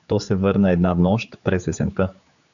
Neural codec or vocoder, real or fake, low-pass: codec, 16 kHz, 4 kbps, X-Codec, HuBERT features, trained on general audio; fake; 7.2 kHz